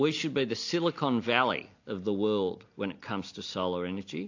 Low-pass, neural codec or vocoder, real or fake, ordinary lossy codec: 7.2 kHz; none; real; AAC, 48 kbps